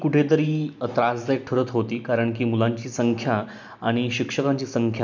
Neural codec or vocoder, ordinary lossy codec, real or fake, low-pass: none; none; real; none